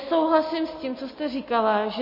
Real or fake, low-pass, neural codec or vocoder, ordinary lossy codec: real; 5.4 kHz; none; MP3, 24 kbps